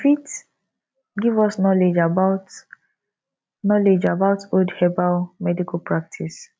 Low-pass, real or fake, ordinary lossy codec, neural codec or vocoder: none; real; none; none